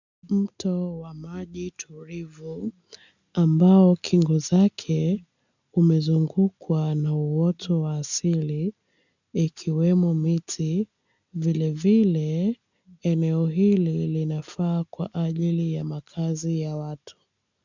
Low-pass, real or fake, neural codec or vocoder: 7.2 kHz; real; none